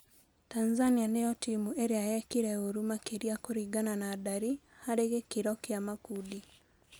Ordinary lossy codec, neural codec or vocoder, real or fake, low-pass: none; none; real; none